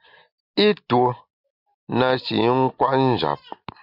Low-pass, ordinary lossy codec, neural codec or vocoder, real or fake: 5.4 kHz; MP3, 48 kbps; none; real